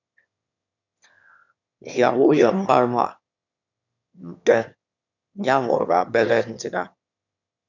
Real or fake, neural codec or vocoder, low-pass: fake; autoencoder, 22.05 kHz, a latent of 192 numbers a frame, VITS, trained on one speaker; 7.2 kHz